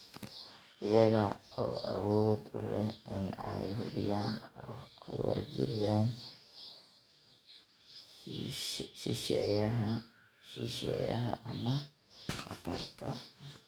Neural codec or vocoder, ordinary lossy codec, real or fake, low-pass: codec, 44.1 kHz, 2.6 kbps, DAC; none; fake; none